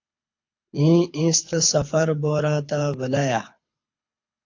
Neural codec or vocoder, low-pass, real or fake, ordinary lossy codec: codec, 24 kHz, 6 kbps, HILCodec; 7.2 kHz; fake; AAC, 48 kbps